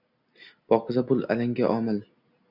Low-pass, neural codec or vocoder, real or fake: 5.4 kHz; none; real